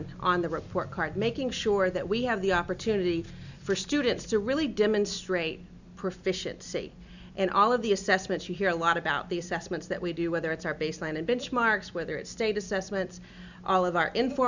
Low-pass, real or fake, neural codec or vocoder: 7.2 kHz; real; none